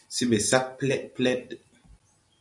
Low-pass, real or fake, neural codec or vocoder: 10.8 kHz; real; none